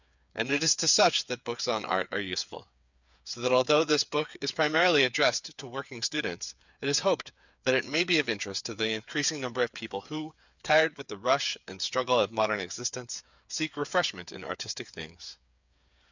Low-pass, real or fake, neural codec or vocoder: 7.2 kHz; fake; codec, 16 kHz, 8 kbps, FreqCodec, smaller model